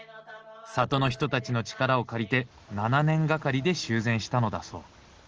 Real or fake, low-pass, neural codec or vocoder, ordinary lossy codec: real; 7.2 kHz; none; Opus, 16 kbps